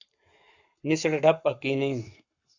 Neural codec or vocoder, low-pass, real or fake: codec, 44.1 kHz, 7.8 kbps, Pupu-Codec; 7.2 kHz; fake